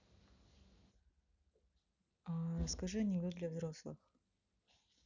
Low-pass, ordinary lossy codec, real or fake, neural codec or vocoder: 7.2 kHz; none; real; none